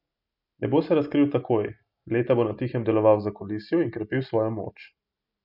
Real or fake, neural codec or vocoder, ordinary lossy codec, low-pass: real; none; none; 5.4 kHz